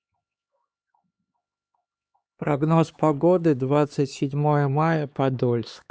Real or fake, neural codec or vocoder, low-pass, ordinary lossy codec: fake; codec, 16 kHz, 2 kbps, X-Codec, HuBERT features, trained on LibriSpeech; none; none